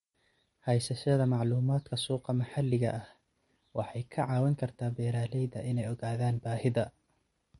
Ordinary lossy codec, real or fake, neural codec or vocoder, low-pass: MP3, 48 kbps; real; none; 19.8 kHz